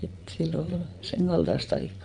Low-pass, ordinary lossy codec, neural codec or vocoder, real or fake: 9.9 kHz; none; vocoder, 22.05 kHz, 80 mel bands, Vocos; fake